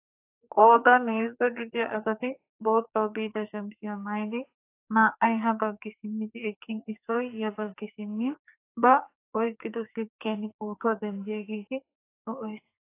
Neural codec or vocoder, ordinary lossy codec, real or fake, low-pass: codec, 44.1 kHz, 2.6 kbps, SNAC; AAC, 24 kbps; fake; 3.6 kHz